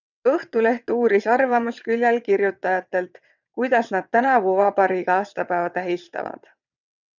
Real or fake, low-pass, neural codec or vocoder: fake; 7.2 kHz; vocoder, 22.05 kHz, 80 mel bands, WaveNeXt